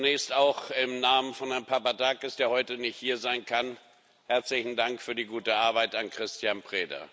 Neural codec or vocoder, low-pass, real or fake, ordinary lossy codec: none; none; real; none